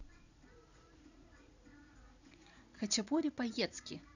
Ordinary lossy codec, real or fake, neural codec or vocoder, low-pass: none; fake; vocoder, 44.1 kHz, 80 mel bands, Vocos; 7.2 kHz